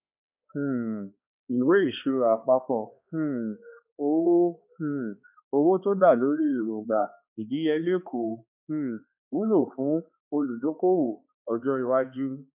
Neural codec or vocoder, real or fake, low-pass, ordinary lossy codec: codec, 16 kHz, 2 kbps, X-Codec, HuBERT features, trained on balanced general audio; fake; 3.6 kHz; none